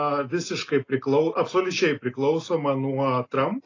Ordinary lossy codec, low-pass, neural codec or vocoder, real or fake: AAC, 32 kbps; 7.2 kHz; none; real